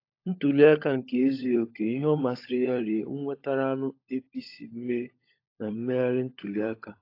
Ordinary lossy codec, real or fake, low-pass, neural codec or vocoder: AAC, 32 kbps; fake; 5.4 kHz; codec, 16 kHz, 16 kbps, FunCodec, trained on LibriTTS, 50 frames a second